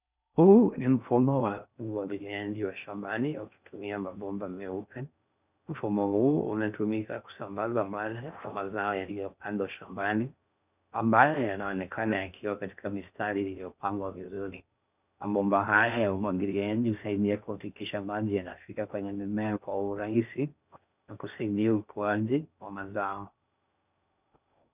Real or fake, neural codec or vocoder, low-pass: fake; codec, 16 kHz in and 24 kHz out, 0.6 kbps, FocalCodec, streaming, 4096 codes; 3.6 kHz